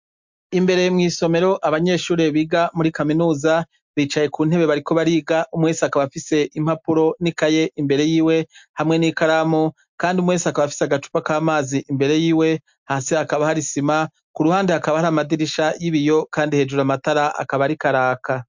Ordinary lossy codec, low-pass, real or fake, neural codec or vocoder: MP3, 64 kbps; 7.2 kHz; real; none